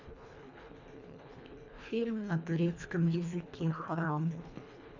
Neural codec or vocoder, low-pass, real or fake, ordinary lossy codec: codec, 24 kHz, 1.5 kbps, HILCodec; 7.2 kHz; fake; none